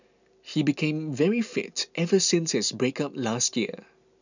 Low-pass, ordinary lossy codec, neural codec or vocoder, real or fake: 7.2 kHz; none; none; real